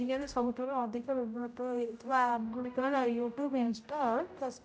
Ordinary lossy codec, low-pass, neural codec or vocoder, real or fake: none; none; codec, 16 kHz, 0.5 kbps, X-Codec, HuBERT features, trained on general audio; fake